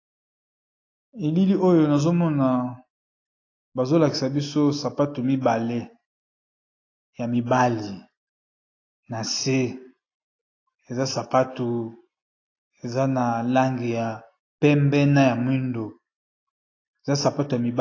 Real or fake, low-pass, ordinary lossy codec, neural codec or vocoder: real; 7.2 kHz; AAC, 32 kbps; none